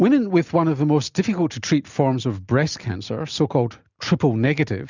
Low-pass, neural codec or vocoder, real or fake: 7.2 kHz; none; real